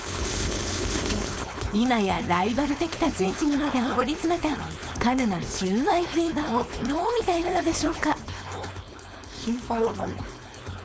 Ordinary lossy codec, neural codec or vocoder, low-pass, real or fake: none; codec, 16 kHz, 4.8 kbps, FACodec; none; fake